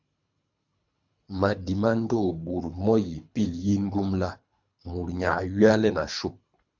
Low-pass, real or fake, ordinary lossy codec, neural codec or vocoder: 7.2 kHz; fake; MP3, 64 kbps; codec, 24 kHz, 3 kbps, HILCodec